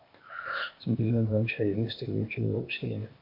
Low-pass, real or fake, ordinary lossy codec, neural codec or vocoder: 5.4 kHz; fake; MP3, 48 kbps; codec, 16 kHz, 0.8 kbps, ZipCodec